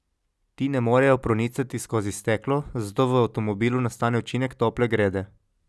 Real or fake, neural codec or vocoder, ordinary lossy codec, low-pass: real; none; none; none